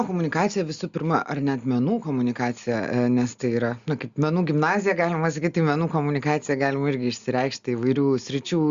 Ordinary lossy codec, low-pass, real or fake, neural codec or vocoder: Opus, 64 kbps; 7.2 kHz; real; none